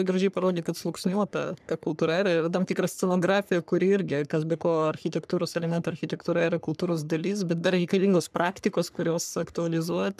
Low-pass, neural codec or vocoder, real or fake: 14.4 kHz; codec, 44.1 kHz, 3.4 kbps, Pupu-Codec; fake